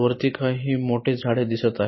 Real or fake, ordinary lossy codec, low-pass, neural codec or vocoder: real; MP3, 24 kbps; 7.2 kHz; none